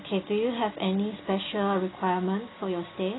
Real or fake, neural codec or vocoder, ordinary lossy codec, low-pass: real; none; AAC, 16 kbps; 7.2 kHz